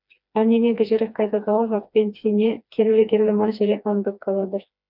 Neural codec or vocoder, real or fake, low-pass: codec, 16 kHz, 2 kbps, FreqCodec, smaller model; fake; 5.4 kHz